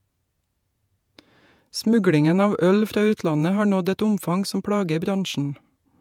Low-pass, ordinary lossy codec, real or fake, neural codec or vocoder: 19.8 kHz; MP3, 96 kbps; fake; vocoder, 48 kHz, 128 mel bands, Vocos